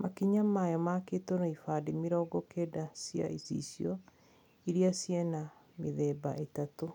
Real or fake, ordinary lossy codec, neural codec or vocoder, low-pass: real; none; none; 19.8 kHz